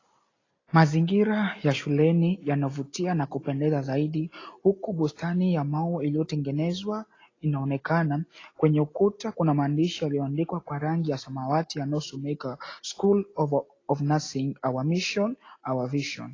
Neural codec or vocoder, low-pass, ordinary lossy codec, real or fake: none; 7.2 kHz; AAC, 32 kbps; real